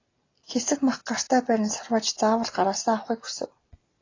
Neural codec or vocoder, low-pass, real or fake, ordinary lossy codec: none; 7.2 kHz; real; AAC, 32 kbps